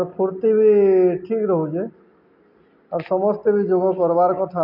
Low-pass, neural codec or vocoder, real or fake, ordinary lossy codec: 5.4 kHz; none; real; none